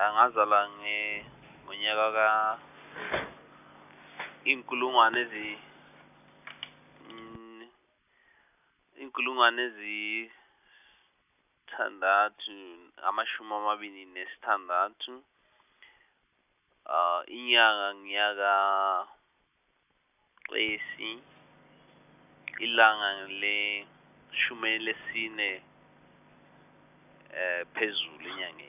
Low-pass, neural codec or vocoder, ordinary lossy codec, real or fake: 3.6 kHz; none; none; real